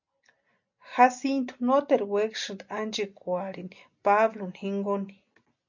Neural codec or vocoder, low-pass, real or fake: none; 7.2 kHz; real